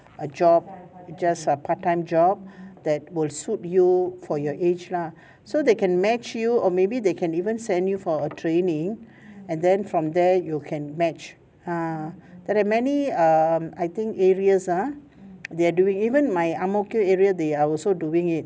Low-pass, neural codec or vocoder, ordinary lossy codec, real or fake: none; none; none; real